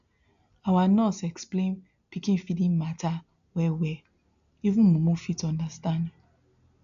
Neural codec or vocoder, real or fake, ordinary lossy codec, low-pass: none; real; none; 7.2 kHz